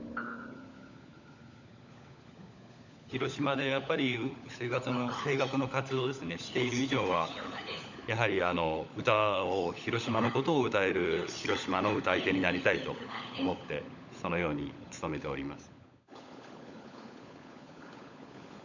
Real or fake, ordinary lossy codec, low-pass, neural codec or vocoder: fake; none; 7.2 kHz; codec, 16 kHz, 16 kbps, FunCodec, trained on LibriTTS, 50 frames a second